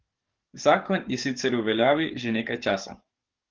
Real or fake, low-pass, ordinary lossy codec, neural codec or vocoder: fake; 7.2 kHz; Opus, 16 kbps; codec, 44.1 kHz, 7.8 kbps, DAC